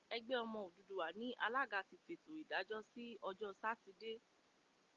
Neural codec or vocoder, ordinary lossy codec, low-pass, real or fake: none; Opus, 32 kbps; 7.2 kHz; real